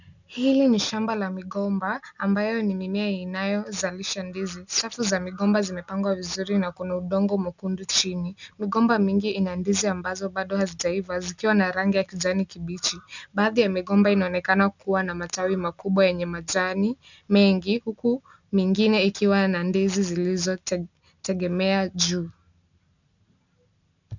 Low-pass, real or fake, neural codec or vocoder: 7.2 kHz; real; none